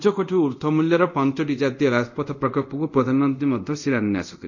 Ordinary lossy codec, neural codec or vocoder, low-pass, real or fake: none; codec, 24 kHz, 0.5 kbps, DualCodec; 7.2 kHz; fake